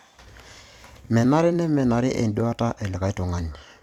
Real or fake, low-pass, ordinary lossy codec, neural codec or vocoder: fake; 19.8 kHz; none; vocoder, 44.1 kHz, 128 mel bands every 256 samples, BigVGAN v2